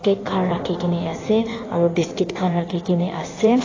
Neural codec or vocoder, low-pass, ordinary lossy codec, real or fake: codec, 16 kHz in and 24 kHz out, 1.1 kbps, FireRedTTS-2 codec; 7.2 kHz; AAC, 32 kbps; fake